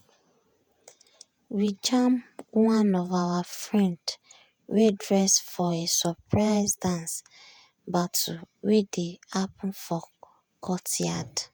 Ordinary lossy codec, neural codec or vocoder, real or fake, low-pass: none; vocoder, 48 kHz, 128 mel bands, Vocos; fake; none